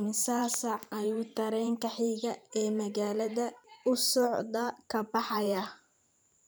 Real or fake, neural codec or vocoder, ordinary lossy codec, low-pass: fake; vocoder, 44.1 kHz, 128 mel bands every 512 samples, BigVGAN v2; none; none